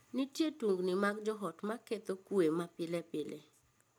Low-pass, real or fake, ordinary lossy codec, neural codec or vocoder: none; real; none; none